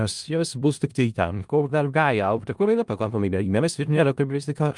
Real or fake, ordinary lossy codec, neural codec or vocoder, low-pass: fake; Opus, 32 kbps; codec, 16 kHz in and 24 kHz out, 0.4 kbps, LongCat-Audio-Codec, four codebook decoder; 10.8 kHz